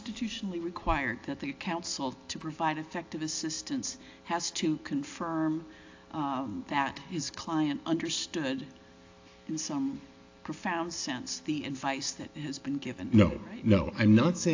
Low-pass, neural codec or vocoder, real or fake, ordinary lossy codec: 7.2 kHz; none; real; AAC, 48 kbps